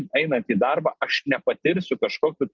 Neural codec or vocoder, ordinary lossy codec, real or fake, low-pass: none; Opus, 24 kbps; real; 7.2 kHz